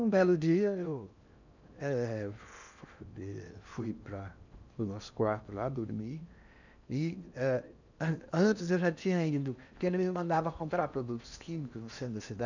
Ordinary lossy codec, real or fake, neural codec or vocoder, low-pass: none; fake; codec, 16 kHz in and 24 kHz out, 0.8 kbps, FocalCodec, streaming, 65536 codes; 7.2 kHz